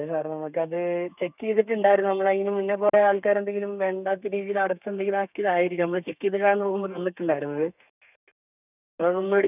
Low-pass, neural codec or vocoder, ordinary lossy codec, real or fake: 3.6 kHz; codec, 32 kHz, 1.9 kbps, SNAC; none; fake